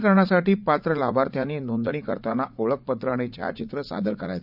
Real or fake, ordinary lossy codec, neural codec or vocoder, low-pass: fake; none; vocoder, 44.1 kHz, 80 mel bands, Vocos; 5.4 kHz